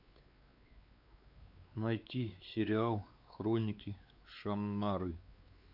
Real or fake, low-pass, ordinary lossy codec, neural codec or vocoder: fake; 5.4 kHz; Opus, 64 kbps; codec, 16 kHz, 4 kbps, X-Codec, WavLM features, trained on Multilingual LibriSpeech